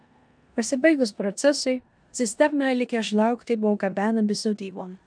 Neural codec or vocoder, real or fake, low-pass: codec, 16 kHz in and 24 kHz out, 0.9 kbps, LongCat-Audio-Codec, four codebook decoder; fake; 9.9 kHz